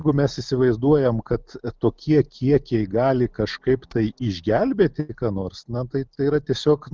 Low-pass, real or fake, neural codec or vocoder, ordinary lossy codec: 7.2 kHz; real; none; Opus, 24 kbps